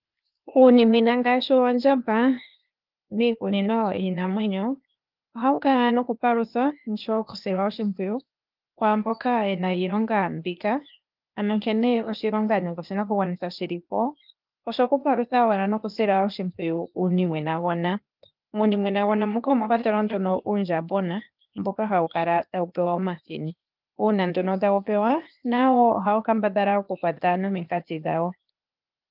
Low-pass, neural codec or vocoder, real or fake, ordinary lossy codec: 5.4 kHz; codec, 16 kHz, 0.8 kbps, ZipCodec; fake; Opus, 24 kbps